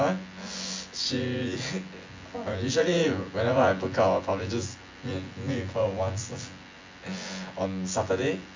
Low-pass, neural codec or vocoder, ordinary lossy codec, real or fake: 7.2 kHz; vocoder, 24 kHz, 100 mel bands, Vocos; MP3, 48 kbps; fake